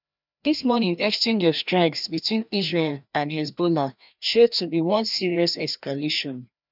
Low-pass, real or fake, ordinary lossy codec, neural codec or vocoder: 5.4 kHz; fake; none; codec, 16 kHz, 1 kbps, FreqCodec, larger model